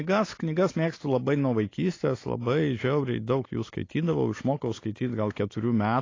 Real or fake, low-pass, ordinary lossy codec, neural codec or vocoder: real; 7.2 kHz; AAC, 32 kbps; none